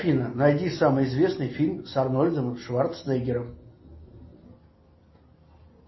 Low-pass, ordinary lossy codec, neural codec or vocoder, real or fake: 7.2 kHz; MP3, 24 kbps; none; real